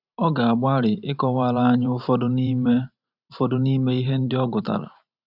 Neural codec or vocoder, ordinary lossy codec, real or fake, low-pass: vocoder, 44.1 kHz, 128 mel bands every 512 samples, BigVGAN v2; none; fake; 5.4 kHz